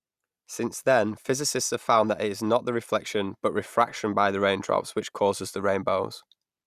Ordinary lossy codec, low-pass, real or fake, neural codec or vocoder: Opus, 64 kbps; 14.4 kHz; real; none